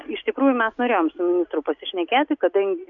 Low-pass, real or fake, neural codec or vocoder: 7.2 kHz; real; none